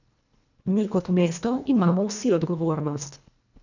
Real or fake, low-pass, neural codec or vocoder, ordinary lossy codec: fake; 7.2 kHz; codec, 24 kHz, 1.5 kbps, HILCodec; none